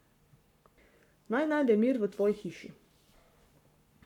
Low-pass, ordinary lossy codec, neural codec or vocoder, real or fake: 19.8 kHz; none; codec, 44.1 kHz, 7.8 kbps, Pupu-Codec; fake